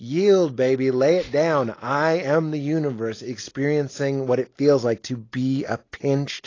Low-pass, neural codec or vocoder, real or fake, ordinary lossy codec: 7.2 kHz; none; real; AAC, 32 kbps